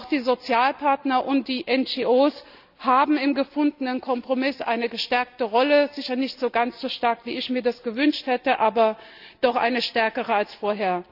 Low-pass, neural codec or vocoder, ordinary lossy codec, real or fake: 5.4 kHz; none; none; real